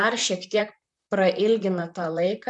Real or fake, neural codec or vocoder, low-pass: fake; vocoder, 44.1 kHz, 128 mel bands, Pupu-Vocoder; 10.8 kHz